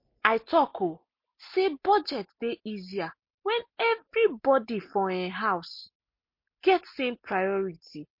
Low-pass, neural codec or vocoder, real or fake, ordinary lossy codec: 5.4 kHz; none; real; MP3, 32 kbps